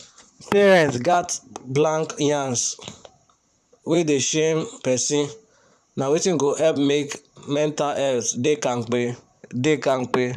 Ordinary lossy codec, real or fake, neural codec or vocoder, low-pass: none; fake; vocoder, 44.1 kHz, 128 mel bands, Pupu-Vocoder; 14.4 kHz